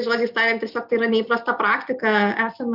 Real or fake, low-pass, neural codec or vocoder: real; 5.4 kHz; none